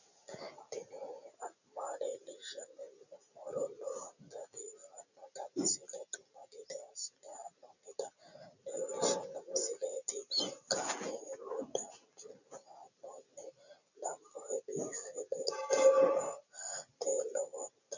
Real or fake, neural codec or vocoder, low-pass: real; none; 7.2 kHz